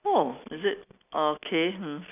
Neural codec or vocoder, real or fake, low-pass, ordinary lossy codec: none; real; 3.6 kHz; none